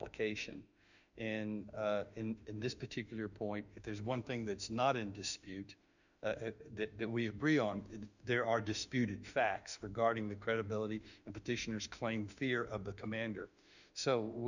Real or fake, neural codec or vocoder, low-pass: fake; autoencoder, 48 kHz, 32 numbers a frame, DAC-VAE, trained on Japanese speech; 7.2 kHz